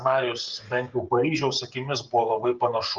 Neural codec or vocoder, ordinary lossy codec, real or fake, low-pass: codec, 16 kHz, 8 kbps, FreqCodec, smaller model; Opus, 24 kbps; fake; 7.2 kHz